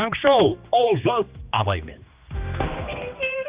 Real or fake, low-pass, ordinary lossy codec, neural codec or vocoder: fake; 3.6 kHz; Opus, 24 kbps; codec, 16 kHz, 2 kbps, X-Codec, HuBERT features, trained on general audio